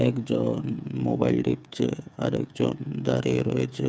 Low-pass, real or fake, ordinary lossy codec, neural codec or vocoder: none; fake; none; codec, 16 kHz, 8 kbps, FreqCodec, smaller model